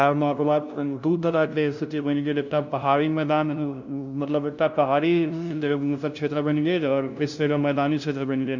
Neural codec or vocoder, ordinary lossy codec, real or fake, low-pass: codec, 16 kHz, 0.5 kbps, FunCodec, trained on LibriTTS, 25 frames a second; none; fake; 7.2 kHz